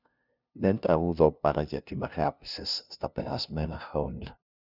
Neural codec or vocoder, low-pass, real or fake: codec, 16 kHz, 0.5 kbps, FunCodec, trained on LibriTTS, 25 frames a second; 5.4 kHz; fake